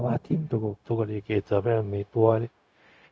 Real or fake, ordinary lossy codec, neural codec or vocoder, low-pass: fake; none; codec, 16 kHz, 0.4 kbps, LongCat-Audio-Codec; none